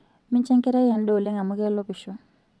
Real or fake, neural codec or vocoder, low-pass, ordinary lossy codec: fake; vocoder, 22.05 kHz, 80 mel bands, WaveNeXt; none; none